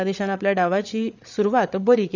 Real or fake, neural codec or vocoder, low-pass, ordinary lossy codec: fake; codec, 16 kHz, 8 kbps, FreqCodec, larger model; 7.2 kHz; none